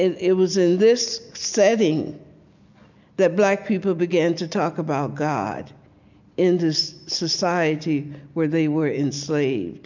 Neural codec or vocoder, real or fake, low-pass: none; real; 7.2 kHz